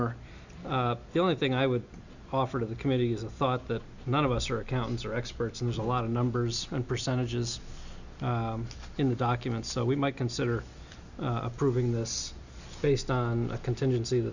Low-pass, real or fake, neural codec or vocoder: 7.2 kHz; real; none